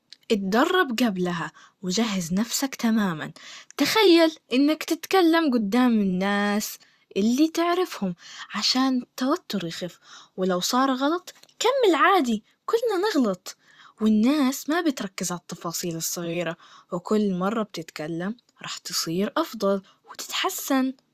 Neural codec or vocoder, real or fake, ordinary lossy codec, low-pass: vocoder, 44.1 kHz, 128 mel bands, Pupu-Vocoder; fake; Opus, 64 kbps; 14.4 kHz